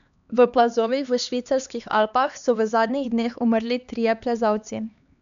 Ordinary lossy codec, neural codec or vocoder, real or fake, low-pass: none; codec, 16 kHz, 2 kbps, X-Codec, HuBERT features, trained on LibriSpeech; fake; 7.2 kHz